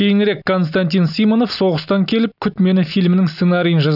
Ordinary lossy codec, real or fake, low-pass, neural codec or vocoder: none; real; 5.4 kHz; none